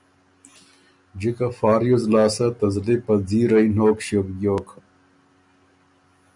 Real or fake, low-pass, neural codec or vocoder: real; 10.8 kHz; none